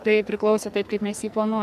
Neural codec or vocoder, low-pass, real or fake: codec, 44.1 kHz, 2.6 kbps, SNAC; 14.4 kHz; fake